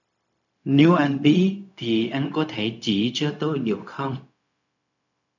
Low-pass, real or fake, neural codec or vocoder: 7.2 kHz; fake; codec, 16 kHz, 0.4 kbps, LongCat-Audio-Codec